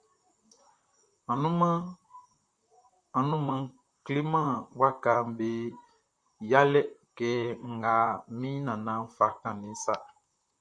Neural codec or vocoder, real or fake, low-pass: vocoder, 44.1 kHz, 128 mel bands, Pupu-Vocoder; fake; 9.9 kHz